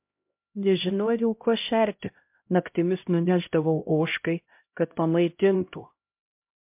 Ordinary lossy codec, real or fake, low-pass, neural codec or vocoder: MP3, 32 kbps; fake; 3.6 kHz; codec, 16 kHz, 0.5 kbps, X-Codec, HuBERT features, trained on LibriSpeech